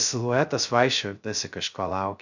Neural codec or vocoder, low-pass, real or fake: codec, 16 kHz, 0.2 kbps, FocalCodec; 7.2 kHz; fake